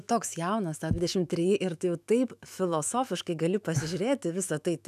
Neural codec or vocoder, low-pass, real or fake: autoencoder, 48 kHz, 128 numbers a frame, DAC-VAE, trained on Japanese speech; 14.4 kHz; fake